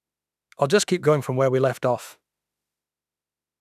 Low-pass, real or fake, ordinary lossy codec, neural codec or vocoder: 14.4 kHz; fake; none; autoencoder, 48 kHz, 32 numbers a frame, DAC-VAE, trained on Japanese speech